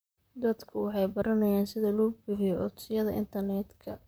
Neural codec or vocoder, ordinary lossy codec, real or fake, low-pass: vocoder, 44.1 kHz, 128 mel bands, Pupu-Vocoder; none; fake; none